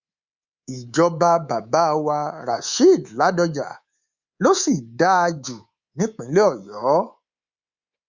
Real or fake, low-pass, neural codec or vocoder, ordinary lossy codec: fake; 7.2 kHz; codec, 24 kHz, 3.1 kbps, DualCodec; Opus, 64 kbps